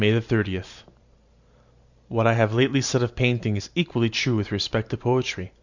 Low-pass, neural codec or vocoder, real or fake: 7.2 kHz; vocoder, 44.1 kHz, 128 mel bands every 512 samples, BigVGAN v2; fake